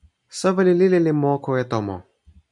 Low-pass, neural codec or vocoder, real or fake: 10.8 kHz; none; real